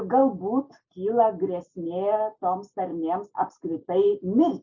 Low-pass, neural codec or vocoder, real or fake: 7.2 kHz; none; real